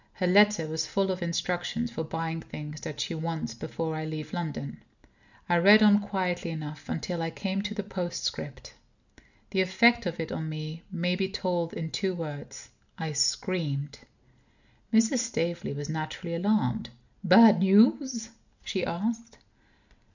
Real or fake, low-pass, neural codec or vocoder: real; 7.2 kHz; none